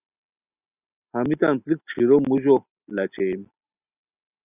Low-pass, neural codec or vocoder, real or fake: 3.6 kHz; none; real